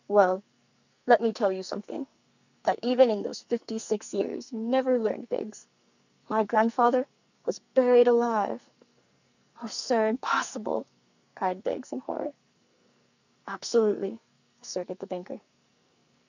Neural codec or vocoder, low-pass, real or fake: codec, 44.1 kHz, 2.6 kbps, SNAC; 7.2 kHz; fake